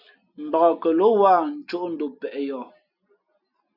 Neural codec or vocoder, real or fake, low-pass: none; real; 5.4 kHz